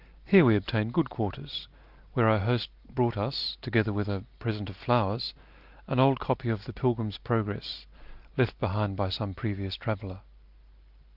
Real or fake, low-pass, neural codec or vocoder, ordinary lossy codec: real; 5.4 kHz; none; Opus, 32 kbps